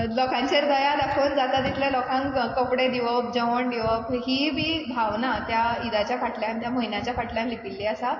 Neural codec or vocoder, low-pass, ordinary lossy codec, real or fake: none; 7.2 kHz; MP3, 32 kbps; real